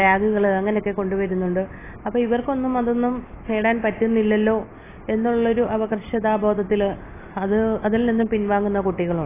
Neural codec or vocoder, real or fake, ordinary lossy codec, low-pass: none; real; AAC, 16 kbps; 3.6 kHz